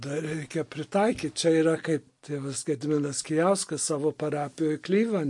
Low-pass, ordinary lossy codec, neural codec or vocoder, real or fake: 10.8 kHz; MP3, 48 kbps; none; real